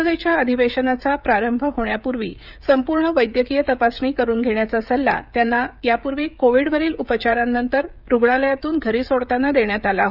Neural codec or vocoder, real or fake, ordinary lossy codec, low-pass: codec, 16 kHz, 16 kbps, FreqCodec, smaller model; fake; none; 5.4 kHz